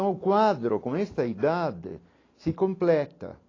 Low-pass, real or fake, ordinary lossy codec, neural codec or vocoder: 7.2 kHz; real; AAC, 32 kbps; none